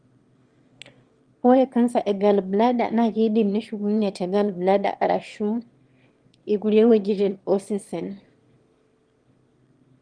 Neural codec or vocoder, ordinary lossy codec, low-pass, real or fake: autoencoder, 22.05 kHz, a latent of 192 numbers a frame, VITS, trained on one speaker; Opus, 24 kbps; 9.9 kHz; fake